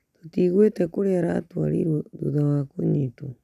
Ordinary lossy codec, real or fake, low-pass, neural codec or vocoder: none; real; 14.4 kHz; none